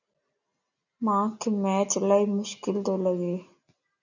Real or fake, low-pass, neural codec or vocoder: real; 7.2 kHz; none